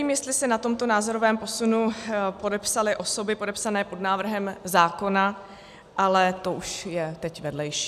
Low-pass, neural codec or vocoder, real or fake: 14.4 kHz; none; real